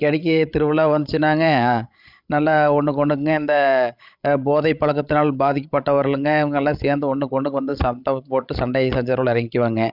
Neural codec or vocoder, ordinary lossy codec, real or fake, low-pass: codec, 16 kHz, 16 kbps, FreqCodec, larger model; none; fake; 5.4 kHz